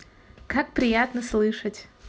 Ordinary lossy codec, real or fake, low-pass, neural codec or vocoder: none; real; none; none